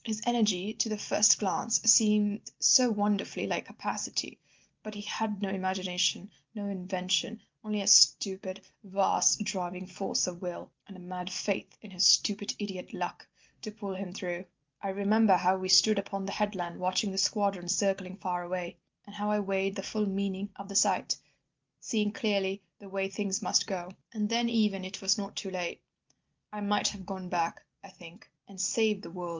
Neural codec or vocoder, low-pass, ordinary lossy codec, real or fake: none; 7.2 kHz; Opus, 24 kbps; real